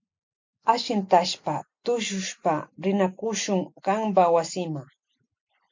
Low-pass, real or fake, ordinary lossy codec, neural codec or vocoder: 7.2 kHz; real; MP3, 48 kbps; none